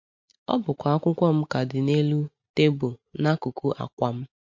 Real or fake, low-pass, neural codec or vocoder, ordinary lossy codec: real; 7.2 kHz; none; MP3, 48 kbps